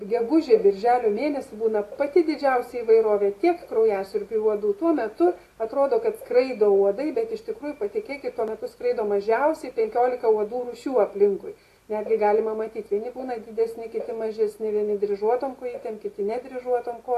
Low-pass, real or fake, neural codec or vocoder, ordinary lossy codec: 14.4 kHz; real; none; AAC, 48 kbps